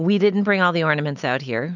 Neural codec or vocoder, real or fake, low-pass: none; real; 7.2 kHz